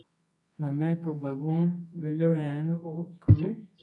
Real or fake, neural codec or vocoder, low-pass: fake; codec, 24 kHz, 0.9 kbps, WavTokenizer, medium music audio release; 10.8 kHz